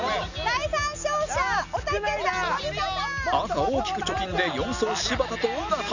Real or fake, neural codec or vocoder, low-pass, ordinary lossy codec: real; none; 7.2 kHz; none